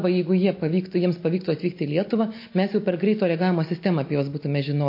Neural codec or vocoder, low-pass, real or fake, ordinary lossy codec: none; 5.4 kHz; real; MP3, 32 kbps